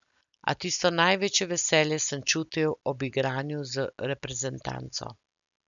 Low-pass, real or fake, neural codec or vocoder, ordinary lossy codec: 7.2 kHz; real; none; none